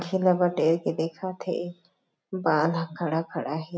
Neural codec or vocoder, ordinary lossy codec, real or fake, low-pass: none; none; real; none